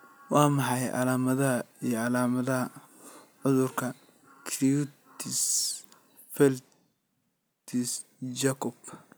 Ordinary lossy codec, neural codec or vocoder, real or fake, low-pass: none; none; real; none